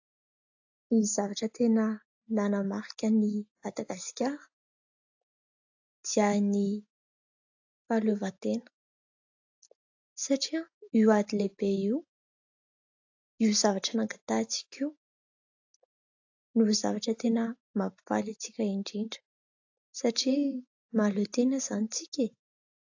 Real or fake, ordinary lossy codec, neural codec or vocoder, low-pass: real; AAC, 48 kbps; none; 7.2 kHz